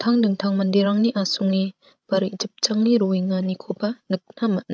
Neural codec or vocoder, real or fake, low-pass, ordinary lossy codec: codec, 16 kHz, 16 kbps, FreqCodec, larger model; fake; none; none